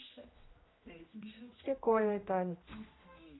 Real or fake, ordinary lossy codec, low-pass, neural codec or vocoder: fake; AAC, 16 kbps; 7.2 kHz; codec, 16 kHz, 1 kbps, X-Codec, HuBERT features, trained on general audio